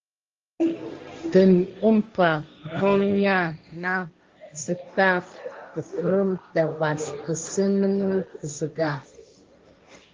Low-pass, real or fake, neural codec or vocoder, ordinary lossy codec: 7.2 kHz; fake; codec, 16 kHz, 1.1 kbps, Voila-Tokenizer; Opus, 32 kbps